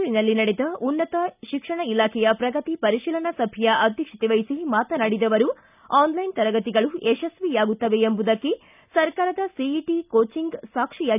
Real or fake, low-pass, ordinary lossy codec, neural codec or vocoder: real; 3.6 kHz; none; none